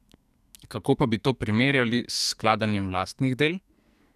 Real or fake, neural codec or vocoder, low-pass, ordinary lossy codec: fake; codec, 44.1 kHz, 2.6 kbps, SNAC; 14.4 kHz; none